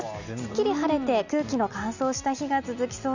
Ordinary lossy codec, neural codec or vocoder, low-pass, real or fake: none; none; 7.2 kHz; real